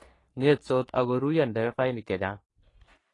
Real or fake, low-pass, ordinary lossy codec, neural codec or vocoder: fake; 10.8 kHz; AAC, 32 kbps; codec, 44.1 kHz, 3.4 kbps, Pupu-Codec